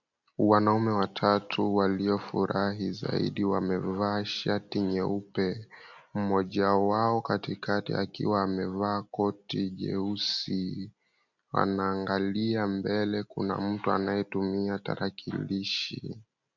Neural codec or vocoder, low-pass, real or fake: none; 7.2 kHz; real